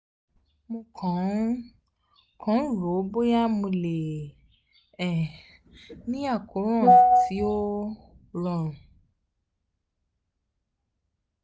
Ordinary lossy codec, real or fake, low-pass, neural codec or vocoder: none; real; none; none